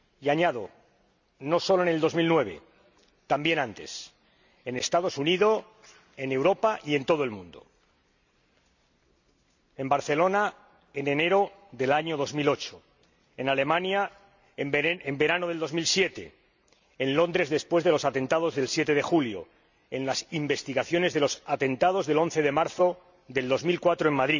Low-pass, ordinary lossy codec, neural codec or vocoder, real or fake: 7.2 kHz; none; none; real